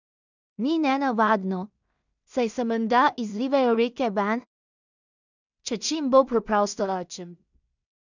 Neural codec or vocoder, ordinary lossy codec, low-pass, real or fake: codec, 16 kHz in and 24 kHz out, 0.4 kbps, LongCat-Audio-Codec, two codebook decoder; none; 7.2 kHz; fake